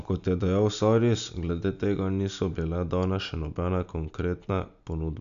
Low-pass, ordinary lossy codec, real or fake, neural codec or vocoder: 7.2 kHz; none; real; none